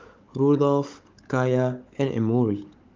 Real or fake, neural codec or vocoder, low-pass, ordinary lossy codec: fake; codec, 16 kHz, 16 kbps, FunCodec, trained on Chinese and English, 50 frames a second; 7.2 kHz; Opus, 24 kbps